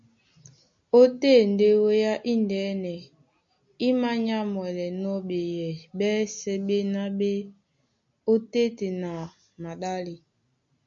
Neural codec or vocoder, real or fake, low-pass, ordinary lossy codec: none; real; 7.2 kHz; MP3, 48 kbps